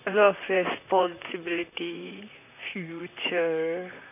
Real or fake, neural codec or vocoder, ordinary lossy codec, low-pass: fake; vocoder, 44.1 kHz, 128 mel bands, Pupu-Vocoder; none; 3.6 kHz